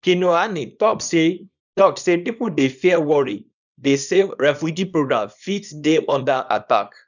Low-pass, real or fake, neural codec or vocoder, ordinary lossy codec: 7.2 kHz; fake; codec, 24 kHz, 0.9 kbps, WavTokenizer, small release; none